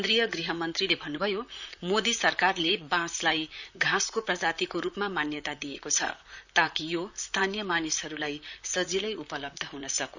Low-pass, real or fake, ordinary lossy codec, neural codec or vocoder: 7.2 kHz; fake; none; vocoder, 44.1 kHz, 128 mel bands, Pupu-Vocoder